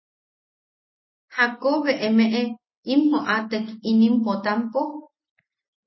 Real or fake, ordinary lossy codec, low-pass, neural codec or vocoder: real; MP3, 24 kbps; 7.2 kHz; none